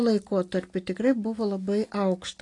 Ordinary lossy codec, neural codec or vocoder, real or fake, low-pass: AAC, 48 kbps; none; real; 10.8 kHz